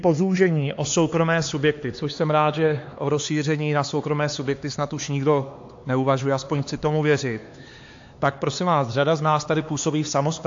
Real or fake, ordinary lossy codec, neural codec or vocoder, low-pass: fake; AAC, 48 kbps; codec, 16 kHz, 4 kbps, X-Codec, HuBERT features, trained on LibriSpeech; 7.2 kHz